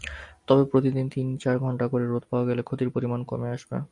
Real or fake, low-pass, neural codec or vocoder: real; 10.8 kHz; none